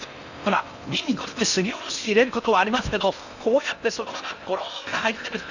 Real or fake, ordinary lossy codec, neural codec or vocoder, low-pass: fake; none; codec, 16 kHz in and 24 kHz out, 0.6 kbps, FocalCodec, streaming, 4096 codes; 7.2 kHz